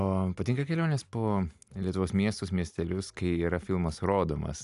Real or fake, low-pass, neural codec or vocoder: real; 10.8 kHz; none